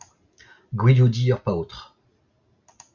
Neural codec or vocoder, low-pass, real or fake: none; 7.2 kHz; real